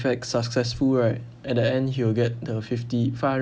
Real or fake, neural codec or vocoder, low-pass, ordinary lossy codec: real; none; none; none